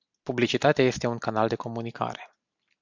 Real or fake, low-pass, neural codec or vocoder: real; 7.2 kHz; none